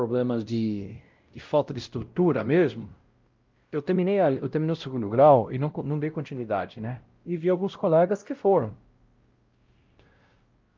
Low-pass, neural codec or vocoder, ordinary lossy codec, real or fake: 7.2 kHz; codec, 16 kHz, 0.5 kbps, X-Codec, WavLM features, trained on Multilingual LibriSpeech; Opus, 24 kbps; fake